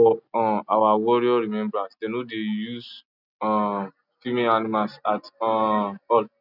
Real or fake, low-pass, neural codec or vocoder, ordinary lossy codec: real; 5.4 kHz; none; none